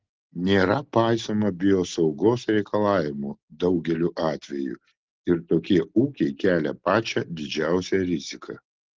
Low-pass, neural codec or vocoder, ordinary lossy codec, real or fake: 7.2 kHz; none; Opus, 16 kbps; real